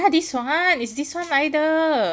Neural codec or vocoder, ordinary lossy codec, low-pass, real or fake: none; none; none; real